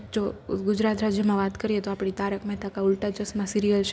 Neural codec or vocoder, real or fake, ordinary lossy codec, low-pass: none; real; none; none